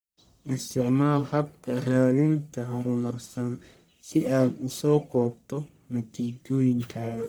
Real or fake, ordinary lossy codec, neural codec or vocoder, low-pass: fake; none; codec, 44.1 kHz, 1.7 kbps, Pupu-Codec; none